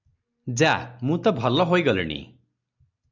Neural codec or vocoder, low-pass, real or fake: none; 7.2 kHz; real